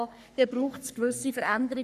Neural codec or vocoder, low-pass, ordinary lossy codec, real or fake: codec, 44.1 kHz, 3.4 kbps, Pupu-Codec; 14.4 kHz; none; fake